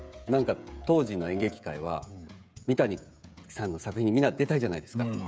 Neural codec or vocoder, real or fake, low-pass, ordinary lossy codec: codec, 16 kHz, 16 kbps, FreqCodec, smaller model; fake; none; none